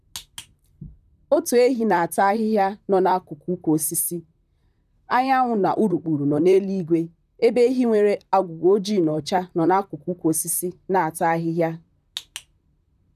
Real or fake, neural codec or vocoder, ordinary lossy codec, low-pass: fake; vocoder, 44.1 kHz, 128 mel bands, Pupu-Vocoder; none; 14.4 kHz